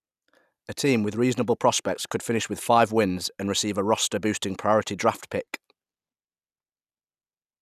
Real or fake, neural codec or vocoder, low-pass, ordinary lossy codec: real; none; 14.4 kHz; none